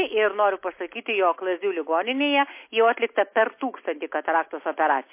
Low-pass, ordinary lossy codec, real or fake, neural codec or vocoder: 3.6 kHz; MP3, 24 kbps; real; none